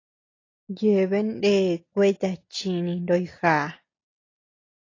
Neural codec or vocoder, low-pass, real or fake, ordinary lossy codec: none; 7.2 kHz; real; AAC, 32 kbps